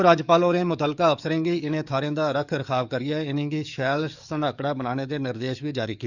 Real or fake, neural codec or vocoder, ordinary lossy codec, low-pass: fake; codec, 44.1 kHz, 7.8 kbps, DAC; none; 7.2 kHz